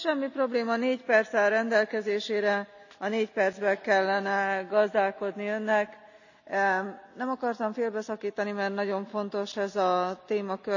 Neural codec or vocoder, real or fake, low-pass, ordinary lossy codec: none; real; 7.2 kHz; none